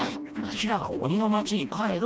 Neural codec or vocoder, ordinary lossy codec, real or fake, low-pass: codec, 16 kHz, 1 kbps, FreqCodec, smaller model; none; fake; none